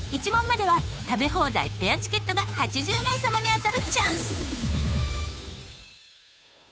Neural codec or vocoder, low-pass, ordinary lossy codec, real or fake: codec, 16 kHz, 2 kbps, FunCodec, trained on Chinese and English, 25 frames a second; none; none; fake